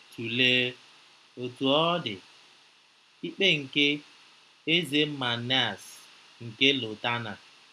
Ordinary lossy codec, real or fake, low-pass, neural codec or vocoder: none; real; none; none